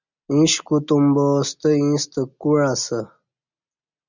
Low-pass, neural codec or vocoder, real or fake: 7.2 kHz; none; real